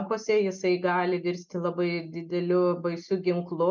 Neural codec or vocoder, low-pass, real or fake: none; 7.2 kHz; real